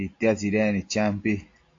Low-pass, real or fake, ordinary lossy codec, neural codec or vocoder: 7.2 kHz; real; AAC, 48 kbps; none